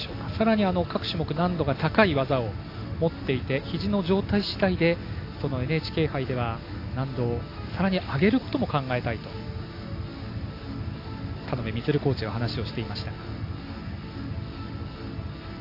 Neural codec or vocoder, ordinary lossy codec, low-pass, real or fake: none; AAC, 32 kbps; 5.4 kHz; real